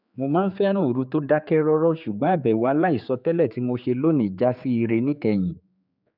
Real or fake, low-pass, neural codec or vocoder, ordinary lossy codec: fake; 5.4 kHz; codec, 16 kHz, 4 kbps, X-Codec, HuBERT features, trained on general audio; none